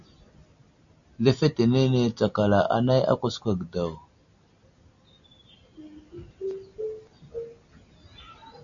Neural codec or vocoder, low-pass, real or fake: none; 7.2 kHz; real